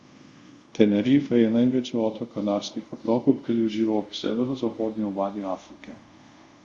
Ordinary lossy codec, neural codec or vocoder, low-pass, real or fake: Opus, 64 kbps; codec, 24 kHz, 0.5 kbps, DualCodec; 10.8 kHz; fake